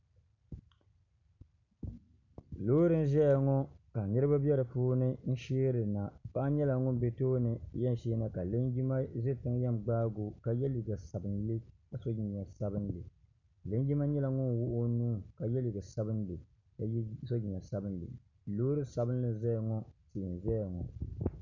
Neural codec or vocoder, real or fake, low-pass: none; real; 7.2 kHz